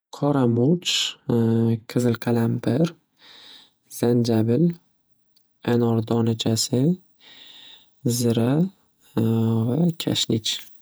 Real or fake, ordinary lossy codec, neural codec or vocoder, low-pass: fake; none; vocoder, 48 kHz, 128 mel bands, Vocos; none